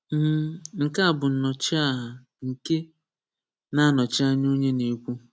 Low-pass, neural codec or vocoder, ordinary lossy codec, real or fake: none; none; none; real